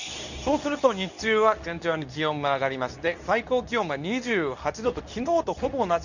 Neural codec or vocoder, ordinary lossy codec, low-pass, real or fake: codec, 24 kHz, 0.9 kbps, WavTokenizer, medium speech release version 2; none; 7.2 kHz; fake